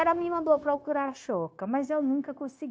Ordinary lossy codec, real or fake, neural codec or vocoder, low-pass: none; fake; codec, 16 kHz, 0.9 kbps, LongCat-Audio-Codec; none